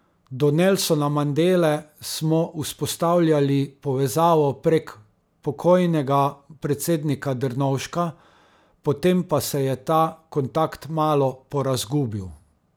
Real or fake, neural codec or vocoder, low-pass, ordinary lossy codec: real; none; none; none